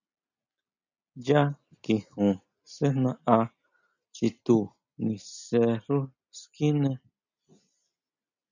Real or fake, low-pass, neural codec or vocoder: real; 7.2 kHz; none